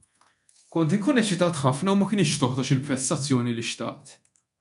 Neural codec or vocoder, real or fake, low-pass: codec, 24 kHz, 0.9 kbps, DualCodec; fake; 10.8 kHz